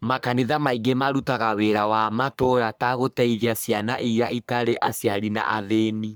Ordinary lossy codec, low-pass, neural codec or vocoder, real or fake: none; none; codec, 44.1 kHz, 3.4 kbps, Pupu-Codec; fake